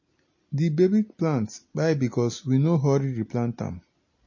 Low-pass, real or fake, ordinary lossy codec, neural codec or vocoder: 7.2 kHz; real; MP3, 32 kbps; none